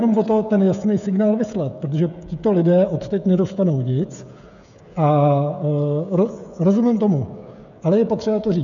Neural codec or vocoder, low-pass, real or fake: codec, 16 kHz, 16 kbps, FreqCodec, smaller model; 7.2 kHz; fake